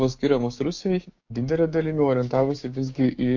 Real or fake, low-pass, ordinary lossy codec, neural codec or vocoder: fake; 7.2 kHz; AAC, 48 kbps; codec, 16 kHz, 6 kbps, DAC